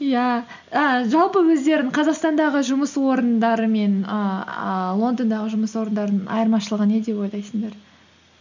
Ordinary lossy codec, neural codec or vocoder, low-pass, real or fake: none; none; 7.2 kHz; real